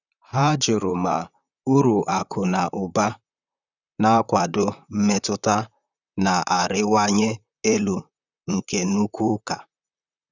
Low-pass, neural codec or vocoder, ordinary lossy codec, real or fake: 7.2 kHz; vocoder, 44.1 kHz, 128 mel bands, Pupu-Vocoder; none; fake